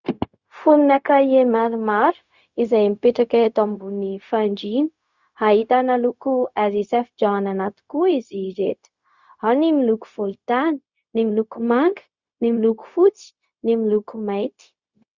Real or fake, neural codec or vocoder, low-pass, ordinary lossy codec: fake; codec, 16 kHz, 0.4 kbps, LongCat-Audio-Codec; 7.2 kHz; Opus, 64 kbps